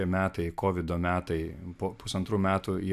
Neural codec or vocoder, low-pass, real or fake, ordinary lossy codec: none; 14.4 kHz; real; AAC, 96 kbps